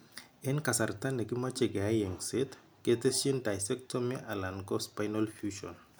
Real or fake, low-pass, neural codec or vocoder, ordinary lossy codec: real; none; none; none